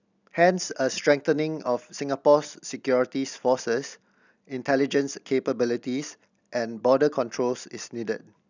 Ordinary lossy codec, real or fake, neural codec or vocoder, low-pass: none; real; none; 7.2 kHz